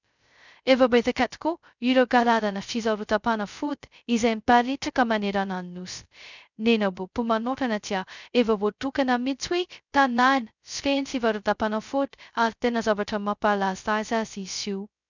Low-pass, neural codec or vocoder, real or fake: 7.2 kHz; codec, 16 kHz, 0.2 kbps, FocalCodec; fake